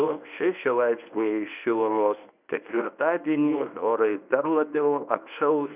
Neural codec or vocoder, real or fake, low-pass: codec, 24 kHz, 0.9 kbps, WavTokenizer, medium speech release version 1; fake; 3.6 kHz